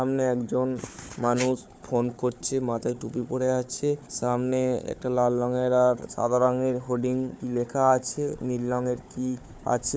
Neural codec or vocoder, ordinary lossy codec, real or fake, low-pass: codec, 16 kHz, 4 kbps, FunCodec, trained on Chinese and English, 50 frames a second; none; fake; none